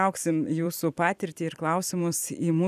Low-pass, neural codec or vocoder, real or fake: 14.4 kHz; vocoder, 44.1 kHz, 128 mel bands every 256 samples, BigVGAN v2; fake